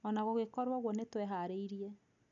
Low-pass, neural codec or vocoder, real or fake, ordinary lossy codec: 7.2 kHz; none; real; none